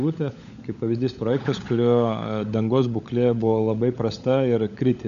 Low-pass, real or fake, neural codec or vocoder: 7.2 kHz; fake; codec, 16 kHz, 16 kbps, FunCodec, trained on LibriTTS, 50 frames a second